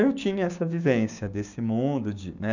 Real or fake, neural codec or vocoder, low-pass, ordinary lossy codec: fake; codec, 16 kHz, 6 kbps, DAC; 7.2 kHz; none